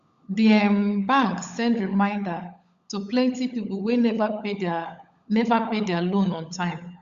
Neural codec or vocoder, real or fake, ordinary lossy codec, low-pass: codec, 16 kHz, 16 kbps, FunCodec, trained on LibriTTS, 50 frames a second; fake; Opus, 64 kbps; 7.2 kHz